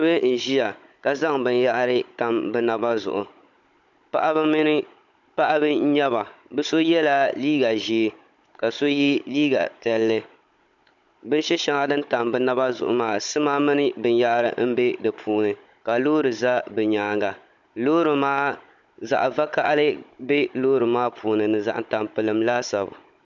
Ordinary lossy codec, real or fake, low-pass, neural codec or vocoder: MP3, 64 kbps; fake; 7.2 kHz; codec, 16 kHz, 16 kbps, FunCodec, trained on Chinese and English, 50 frames a second